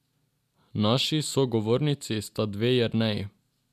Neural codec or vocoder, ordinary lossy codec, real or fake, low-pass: none; none; real; 14.4 kHz